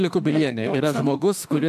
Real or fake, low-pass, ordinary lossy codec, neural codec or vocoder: fake; 14.4 kHz; AAC, 96 kbps; autoencoder, 48 kHz, 32 numbers a frame, DAC-VAE, trained on Japanese speech